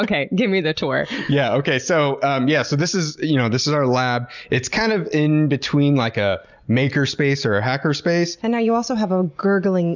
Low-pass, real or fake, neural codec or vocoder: 7.2 kHz; real; none